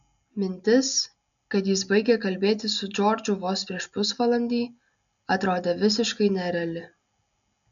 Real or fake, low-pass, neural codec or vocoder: real; 7.2 kHz; none